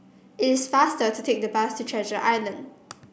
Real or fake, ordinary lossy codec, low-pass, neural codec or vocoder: real; none; none; none